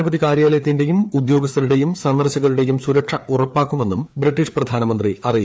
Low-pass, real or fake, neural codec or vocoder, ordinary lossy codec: none; fake; codec, 16 kHz, 4 kbps, FreqCodec, larger model; none